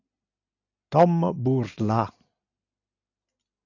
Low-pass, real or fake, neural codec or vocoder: 7.2 kHz; real; none